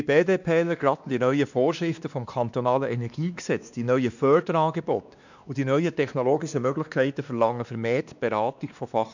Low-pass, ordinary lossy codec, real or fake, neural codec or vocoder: 7.2 kHz; none; fake; codec, 16 kHz, 2 kbps, X-Codec, WavLM features, trained on Multilingual LibriSpeech